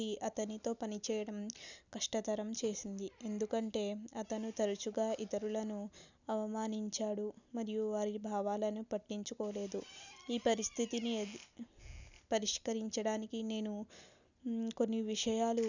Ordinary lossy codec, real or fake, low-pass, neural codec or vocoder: none; real; 7.2 kHz; none